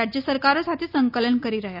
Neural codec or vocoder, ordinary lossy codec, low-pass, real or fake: none; none; 5.4 kHz; real